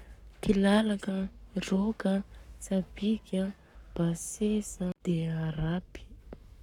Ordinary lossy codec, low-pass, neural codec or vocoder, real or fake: none; 19.8 kHz; codec, 44.1 kHz, 7.8 kbps, Pupu-Codec; fake